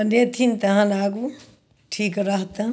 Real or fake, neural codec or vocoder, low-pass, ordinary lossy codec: real; none; none; none